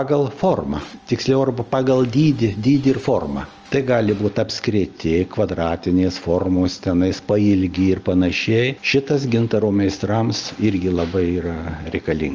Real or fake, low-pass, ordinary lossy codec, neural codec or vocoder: real; 7.2 kHz; Opus, 24 kbps; none